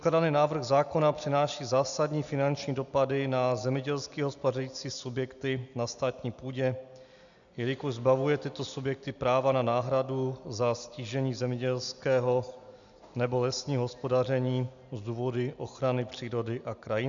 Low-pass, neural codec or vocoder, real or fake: 7.2 kHz; none; real